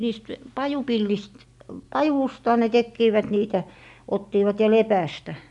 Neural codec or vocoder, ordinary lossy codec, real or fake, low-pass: none; none; real; 10.8 kHz